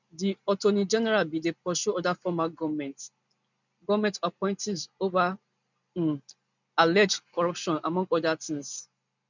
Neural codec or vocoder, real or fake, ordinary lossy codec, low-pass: none; real; none; 7.2 kHz